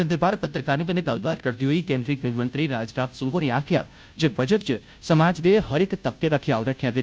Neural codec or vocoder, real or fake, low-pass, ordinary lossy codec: codec, 16 kHz, 0.5 kbps, FunCodec, trained on Chinese and English, 25 frames a second; fake; none; none